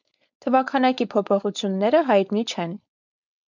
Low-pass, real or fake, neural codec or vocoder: 7.2 kHz; fake; codec, 16 kHz, 4.8 kbps, FACodec